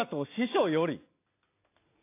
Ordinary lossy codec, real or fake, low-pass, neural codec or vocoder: AAC, 24 kbps; real; 3.6 kHz; none